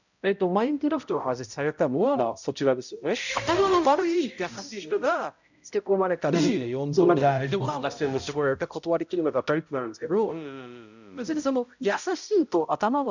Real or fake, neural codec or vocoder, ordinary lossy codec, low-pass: fake; codec, 16 kHz, 0.5 kbps, X-Codec, HuBERT features, trained on balanced general audio; none; 7.2 kHz